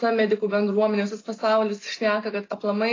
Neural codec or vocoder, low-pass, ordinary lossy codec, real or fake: none; 7.2 kHz; AAC, 32 kbps; real